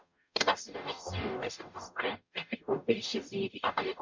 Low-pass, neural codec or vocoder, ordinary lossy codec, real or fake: 7.2 kHz; codec, 44.1 kHz, 0.9 kbps, DAC; MP3, 64 kbps; fake